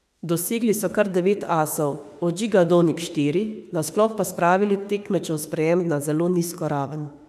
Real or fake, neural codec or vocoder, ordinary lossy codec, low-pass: fake; autoencoder, 48 kHz, 32 numbers a frame, DAC-VAE, trained on Japanese speech; none; 14.4 kHz